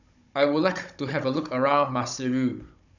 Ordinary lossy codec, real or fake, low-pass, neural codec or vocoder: none; fake; 7.2 kHz; codec, 16 kHz, 16 kbps, FunCodec, trained on Chinese and English, 50 frames a second